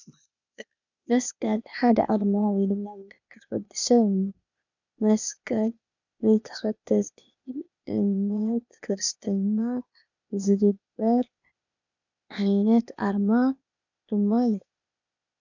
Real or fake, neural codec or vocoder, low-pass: fake; codec, 16 kHz, 0.8 kbps, ZipCodec; 7.2 kHz